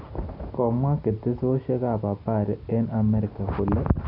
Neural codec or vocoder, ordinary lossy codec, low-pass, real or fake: none; none; 5.4 kHz; real